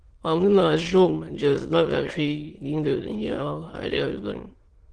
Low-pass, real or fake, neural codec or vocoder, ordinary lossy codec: 9.9 kHz; fake; autoencoder, 22.05 kHz, a latent of 192 numbers a frame, VITS, trained on many speakers; Opus, 16 kbps